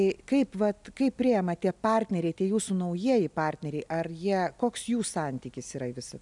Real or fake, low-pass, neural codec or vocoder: real; 10.8 kHz; none